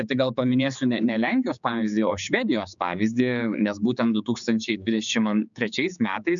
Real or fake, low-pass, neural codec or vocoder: fake; 7.2 kHz; codec, 16 kHz, 4 kbps, X-Codec, HuBERT features, trained on general audio